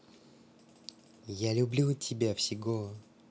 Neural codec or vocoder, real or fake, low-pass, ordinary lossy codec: none; real; none; none